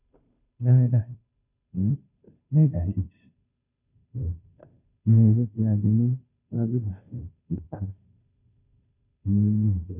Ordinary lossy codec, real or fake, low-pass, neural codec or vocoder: none; fake; 3.6 kHz; codec, 16 kHz, 0.5 kbps, FunCodec, trained on Chinese and English, 25 frames a second